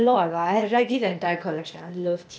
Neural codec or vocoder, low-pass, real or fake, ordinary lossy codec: codec, 16 kHz, 0.8 kbps, ZipCodec; none; fake; none